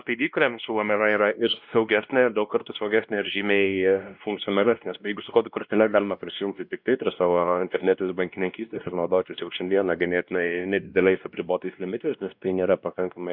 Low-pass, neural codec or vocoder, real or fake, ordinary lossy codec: 5.4 kHz; codec, 16 kHz, 1 kbps, X-Codec, WavLM features, trained on Multilingual LibriSpeech; fake; Opus, 64 kbps